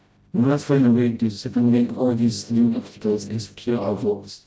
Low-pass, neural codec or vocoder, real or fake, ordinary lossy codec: none; codec, 16 kHz, 0.5 kbps, FreqCodec, smaller model; fake; none